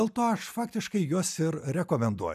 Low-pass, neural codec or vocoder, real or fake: 14.4 kHz; none; real